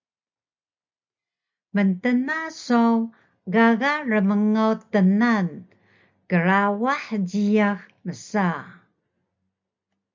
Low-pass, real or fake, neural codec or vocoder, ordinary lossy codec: 7.2 kHz; real; none; MP3, 64 kbps